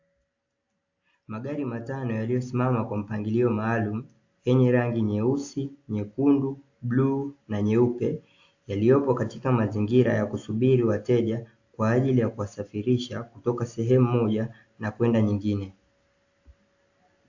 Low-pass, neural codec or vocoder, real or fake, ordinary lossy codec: 7.2 kHz; none; real; AAC, 48 kbps